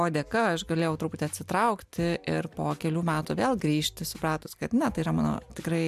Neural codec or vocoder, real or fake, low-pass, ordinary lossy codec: none; real; 14.4 kHz; MP3, 96 kbps